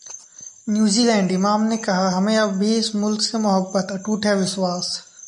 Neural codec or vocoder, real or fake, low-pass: none; real; 10.8 kHz